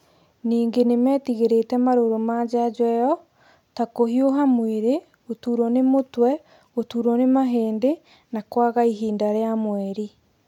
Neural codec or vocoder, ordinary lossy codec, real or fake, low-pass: none; none; real; 19.8 kHz